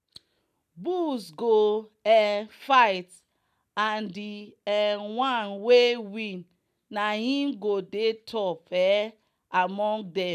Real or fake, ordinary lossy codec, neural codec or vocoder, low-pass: real; none; none; 14.4 kHz